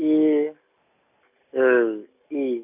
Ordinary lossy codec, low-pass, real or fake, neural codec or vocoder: none; 3.6 kHz; real; none